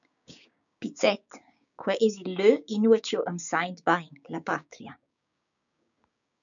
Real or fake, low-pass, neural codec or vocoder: fake; 7.2 kHz; codec, 16 kHz, 6 kbps, DAC